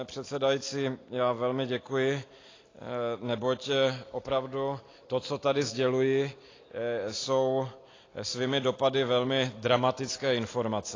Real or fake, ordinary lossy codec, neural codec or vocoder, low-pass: real; AAC, 32 kbps; none; 7.2 kHz